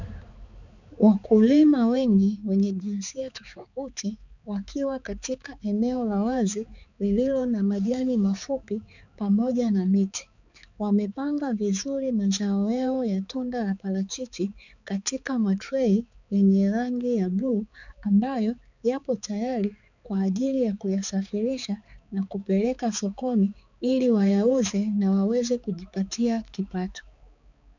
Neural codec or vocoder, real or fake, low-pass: codec, 16 kHz, 4 kbps, X-Codec, HuBERT features, trained on balanced general audio; fake; 7.2 kHz